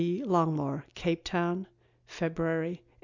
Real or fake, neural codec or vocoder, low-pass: real; none; 7.2 kHz